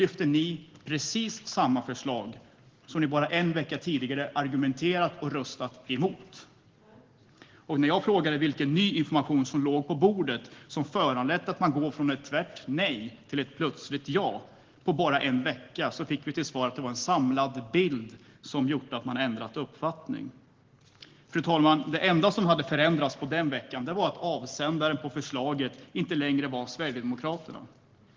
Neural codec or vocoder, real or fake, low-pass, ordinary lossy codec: none; real; 7.2 kHz; Opus, 16 kbps